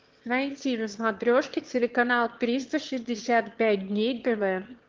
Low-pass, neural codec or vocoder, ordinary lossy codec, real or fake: 7.2 kHz; autoencoder, 22.05 kHz, a latent of 192 numbers a frame, VITS, trained on one speaker; Opus, 16 kbps; fake